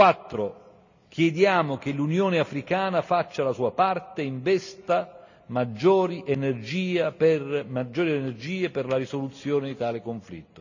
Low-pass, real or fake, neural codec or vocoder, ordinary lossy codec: 7.2 kHz; real; none; none